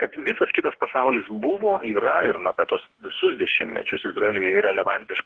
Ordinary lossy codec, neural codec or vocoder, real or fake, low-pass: Opus, 16 kbps; codec, 44.1 kHz, 2.6 kbps, DAC; fake; 9.9 kHz